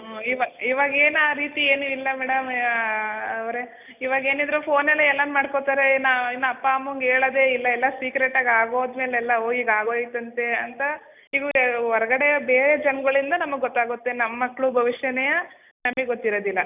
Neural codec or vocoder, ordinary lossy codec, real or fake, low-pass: none; none; real; 3.6 kHz